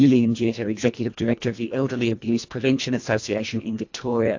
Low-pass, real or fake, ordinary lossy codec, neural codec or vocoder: 7.2 kHz; fake; AAC, 48 kbps; codec, 24 kHz, 1.5 kbps, HILCodec